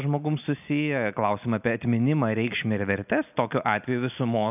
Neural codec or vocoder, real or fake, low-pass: none; real; 3.6 kHz